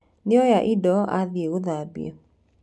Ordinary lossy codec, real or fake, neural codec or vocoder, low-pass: none; real; none; none